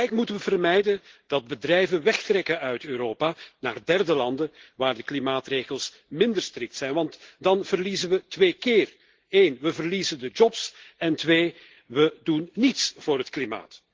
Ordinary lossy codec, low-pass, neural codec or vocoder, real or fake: Opus, 16 kbps; 7.2 kHz; none; real